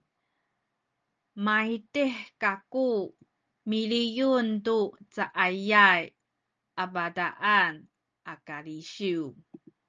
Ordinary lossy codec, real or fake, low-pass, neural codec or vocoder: Opus, 24 kbps; real; 7.2 kHz; none